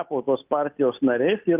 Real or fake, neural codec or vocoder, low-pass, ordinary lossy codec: real; none; 3.6 kHz; Opus, 24 kbps